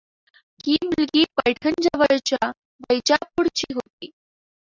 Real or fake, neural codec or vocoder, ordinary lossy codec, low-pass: real; none; Opus, 64 kbps; 7.2 kHz